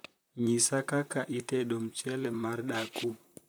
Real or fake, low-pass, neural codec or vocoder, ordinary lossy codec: fake; none; vocoder, 44.1 kHz, 128 mel bands, Pupu-Vocoder; none